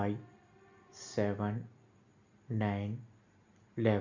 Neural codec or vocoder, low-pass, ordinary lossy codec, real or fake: none; 7.2 kHz; Opus, 64 kbps; real